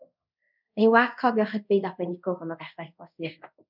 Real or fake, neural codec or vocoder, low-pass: fake; codec, 24 kHz, 0.5 kbps, DualCodec; 5.4 kHz